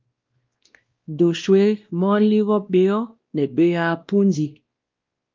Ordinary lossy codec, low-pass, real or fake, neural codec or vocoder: Opus, 32 kbps; 7.2 kHz; fake; codec, 16 kHz, 1 kbps, X-Codec, WavLM features, trained on Multilingual LibriSpeech